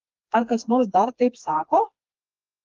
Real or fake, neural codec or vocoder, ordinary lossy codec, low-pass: fake; codec, 16 kHz, 2 kbps, FreqCodec, smaller model; Opus, 32 kbps; 7.2 kHz